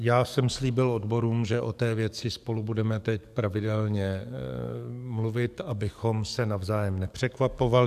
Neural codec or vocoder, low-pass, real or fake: codec, 44.1 kHz, 7.8 kbps, DAC; 14.4 kHz; fake